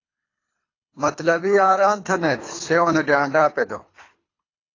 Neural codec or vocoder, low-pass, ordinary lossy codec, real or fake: codec, 24 kHz, 3 kbps, HILCodec; 7.2 kHz; AAC, 32 kbps; fake